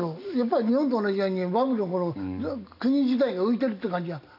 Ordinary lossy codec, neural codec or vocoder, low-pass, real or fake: none; none; 5.4 kHz; real